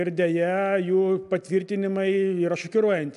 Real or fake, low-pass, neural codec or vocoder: real; 10.8 kHz; none